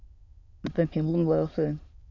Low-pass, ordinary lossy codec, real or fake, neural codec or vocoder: 7.2 kHz; AAC, 48 kbps; fake; autoencoder, 22.05 kHz, a latent of 192 numbers a frame, VITS, trained on many speakers